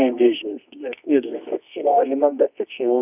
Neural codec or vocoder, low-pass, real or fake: codec, 24 kHz, 0.9 kbps, WavTokenizer, medium music audio release; 3.6 kHz; fake